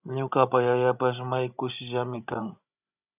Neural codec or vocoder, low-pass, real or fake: codec, 16 kHz, 16 kbps, FreqCodec, larger model; 3.6 kHz; fake